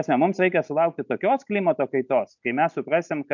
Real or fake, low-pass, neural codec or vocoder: real; 7.2 kHz; none